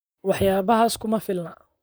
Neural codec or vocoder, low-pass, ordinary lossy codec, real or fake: vocoder, 44.1 kHz, 128 mel bands, Pupu-Vocoder; none; none; fake